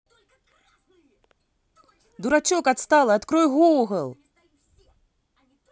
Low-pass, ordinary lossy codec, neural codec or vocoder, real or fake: none; none; none; real